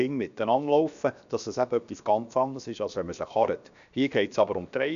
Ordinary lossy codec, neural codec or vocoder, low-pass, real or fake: none; codec, 16 kHz, 0.7 kbps, FocalCodec; 7.2 kHz; fake